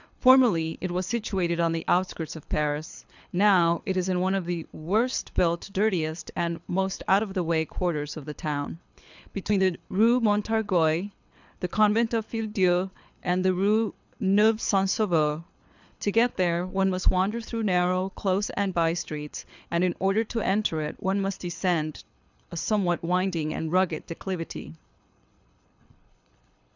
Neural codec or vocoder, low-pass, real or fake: codec, 24 kHz, 6 kbps, HILCodec; 7.2 kHz; fake